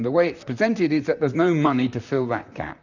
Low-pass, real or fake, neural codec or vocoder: 7.2 kHz; fake; vocoder, 44.1 kHz, 128 mel bands, Pupu-Vocoder